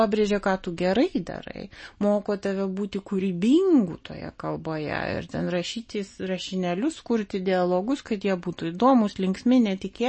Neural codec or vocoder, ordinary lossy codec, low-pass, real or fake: none; MP3, 32 kbps; 9.9 kHz; real